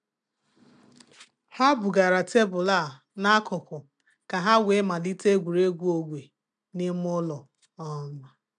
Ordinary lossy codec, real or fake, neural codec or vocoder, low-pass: none; real; none; 9.9 kHz